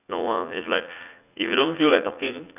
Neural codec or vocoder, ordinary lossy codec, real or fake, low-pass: vocoder, 44.1 kHz, 80 mel bands, Vocos; none; fake; 3.6 kHz